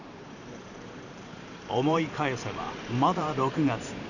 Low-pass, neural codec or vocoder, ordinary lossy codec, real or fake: 7.2 kHz; vocoder, 44.1 kHz, 128 mel bands every 512 samples, BigVGAN v2; none; fake